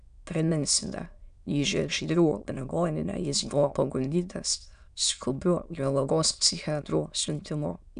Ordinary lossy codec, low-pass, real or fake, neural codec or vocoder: AAC, 96 kbps; 9.9 kHz; fake; autoencoder, 22.05 kHz, a latent of 192 numbers a frame, VITS, trained on many speakers